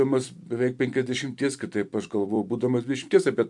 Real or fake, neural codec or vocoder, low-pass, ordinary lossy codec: fake; vocoder, 44.1 kHz, 128 mel bands every 256 samples, BigVGAN v2; 10.8 kHz; MP3, 64 kbps